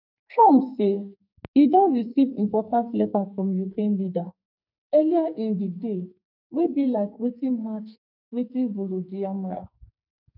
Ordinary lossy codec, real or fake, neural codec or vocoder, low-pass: none; fake; codec, 44.1 kHz, 2.6 kbps, SNAC; 5.4 kHz